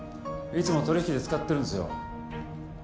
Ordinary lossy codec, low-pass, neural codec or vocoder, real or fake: none; none; none; real